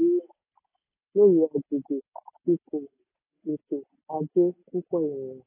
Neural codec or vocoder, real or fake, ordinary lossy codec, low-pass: none; real; AAC, 16 kbps; 3.6 kHz